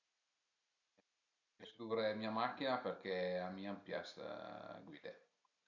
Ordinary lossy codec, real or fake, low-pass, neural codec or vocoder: none; real; 7.2 kHz; none